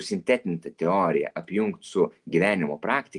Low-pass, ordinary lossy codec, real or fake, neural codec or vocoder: 10.8 kHz; Opus, 32 kbps; real; none